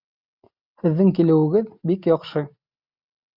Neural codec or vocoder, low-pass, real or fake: none; 5.4 kHz; real